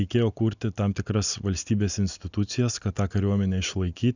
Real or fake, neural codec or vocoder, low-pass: real; none; 7.2 kHz